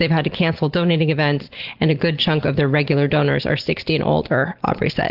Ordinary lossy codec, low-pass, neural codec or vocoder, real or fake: Opus, 16 kbps; 5.4 kHz; none; real